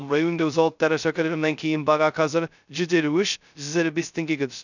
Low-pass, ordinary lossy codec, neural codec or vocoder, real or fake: 7.2 kHz; none; codec, 16 kHz, 0.2 kbps, FocalCodec; fake